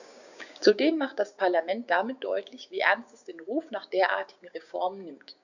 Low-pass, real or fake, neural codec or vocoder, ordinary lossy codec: 7.2 kHz; fake; codec, 16 kHz, 6 kbps, DAC; none